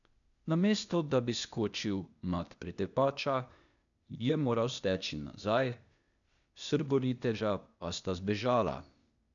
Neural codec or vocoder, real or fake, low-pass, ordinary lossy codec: codec, 16 kHz, 0.8 kbps, ZipCodec; fake; 7.2 kHz; none